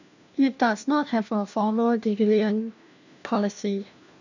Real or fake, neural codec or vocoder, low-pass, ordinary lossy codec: fake; codec, 16 kHz, 1 kbps, FunCodec, trained on LibriTTS, 50 frames a second; 7.2 kHz; none